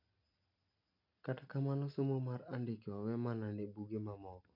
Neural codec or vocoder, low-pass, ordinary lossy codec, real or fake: none; 5.4 kHz; none; real